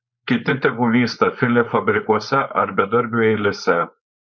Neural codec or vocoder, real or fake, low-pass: codec, 16 kHz, 4.8 kbps, FACodec; fake; 7.2 kHz